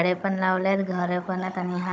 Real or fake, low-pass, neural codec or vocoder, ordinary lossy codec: fake; none; codec, 16 kHz, 16 kbps, FunCodec, trained on Chinese and English, 50 frames a second; none